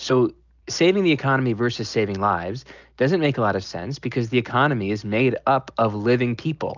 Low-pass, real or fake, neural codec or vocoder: 7.2 kHz; real; none